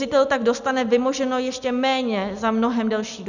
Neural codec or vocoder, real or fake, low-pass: none; real; 7.2 kHz